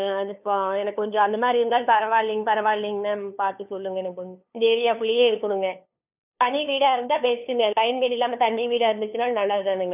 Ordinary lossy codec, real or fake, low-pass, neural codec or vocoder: none; fake; 3.6 kHz; codec, 16 kHz, 2 kbps, FunCodec, trained on LibriTTS, 25 frames a second